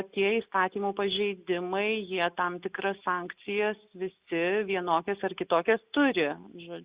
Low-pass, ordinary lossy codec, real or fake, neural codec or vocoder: 3.6 kHz; Opus, 64 kbps; real; none